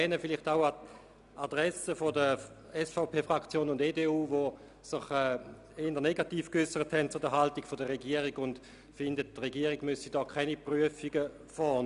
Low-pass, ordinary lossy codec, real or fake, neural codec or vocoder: 10.8 kHz; none; real; none